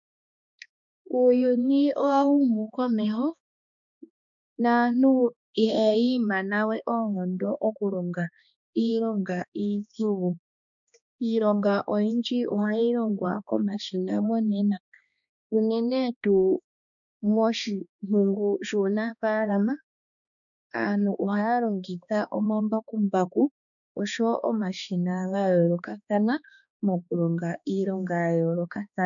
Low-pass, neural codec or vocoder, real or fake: 7.2 kHz; codec, 16 kHz, 2 kbps, X-Codec, HuBERT features, trained on balanced general audio; fake